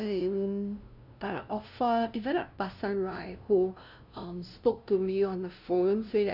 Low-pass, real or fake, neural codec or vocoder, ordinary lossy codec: 5.4 kHz; fake; codec, 16 kHz, 0.5 kbps, FunCodec, trained on LibriTTS, 25 frames a second; none